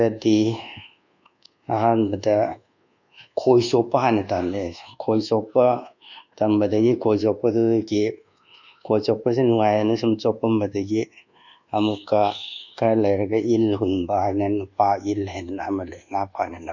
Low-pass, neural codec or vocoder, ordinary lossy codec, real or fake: 7.2 kHz; codec, 24 kHz, 1.2 kbps, DualCodec; none; fake